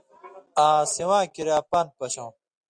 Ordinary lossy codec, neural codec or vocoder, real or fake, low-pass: Opus, 64 kbps; none; real; 9.9 kHz